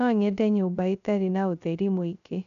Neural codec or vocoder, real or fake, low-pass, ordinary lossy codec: codec, 16 kHz, 0.3 kbps, FocalCodec; fake; 7.2 kHz; none